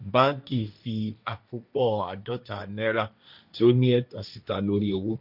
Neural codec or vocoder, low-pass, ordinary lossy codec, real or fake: codec, 16 kHz, 1.1 kbps, Voila-Tokenizer; 5.4 kHz; none; fake